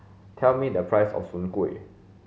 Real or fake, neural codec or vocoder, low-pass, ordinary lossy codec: real; none; none; none